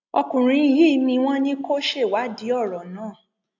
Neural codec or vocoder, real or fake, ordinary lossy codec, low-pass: none; real; AAC, 48 kbps; 7.2 kHz